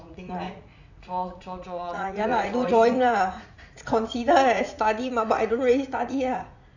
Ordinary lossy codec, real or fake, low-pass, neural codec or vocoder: none; fake; 7.2 kHz; vocoder, 44.1 kHz, 80 mel bands, Vocos